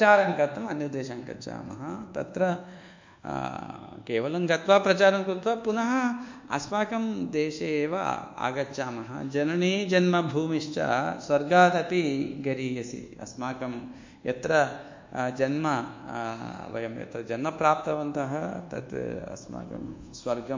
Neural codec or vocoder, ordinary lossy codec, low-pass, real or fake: codec, 24 kHz, 1.2 kbps, DualCodec; MP3, 64 kbps; 7.2 kHz; fake